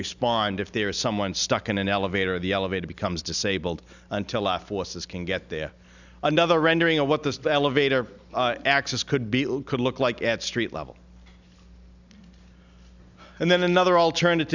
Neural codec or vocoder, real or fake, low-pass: none; real; 7.2 kHz